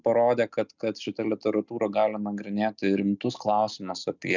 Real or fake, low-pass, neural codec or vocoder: real; 7.2 kHz; none